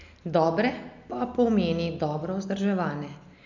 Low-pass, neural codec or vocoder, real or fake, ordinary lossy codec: 7.2 kHz; none; real; none